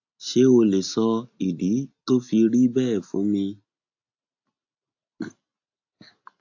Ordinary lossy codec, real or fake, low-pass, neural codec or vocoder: AAC, 48 kbps; real; 7.2 kHz; none